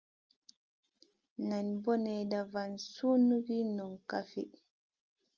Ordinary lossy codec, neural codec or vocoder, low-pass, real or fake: Opus, 24 kbps; none; 7.2 kHz; real